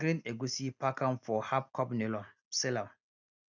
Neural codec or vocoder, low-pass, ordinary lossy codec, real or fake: none; 7.2 kHz; none; real